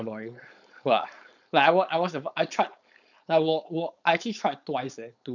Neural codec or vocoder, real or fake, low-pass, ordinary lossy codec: codec, 16 kHz, 4.8 kbps, FACodec; fake; 7.2 kHz; none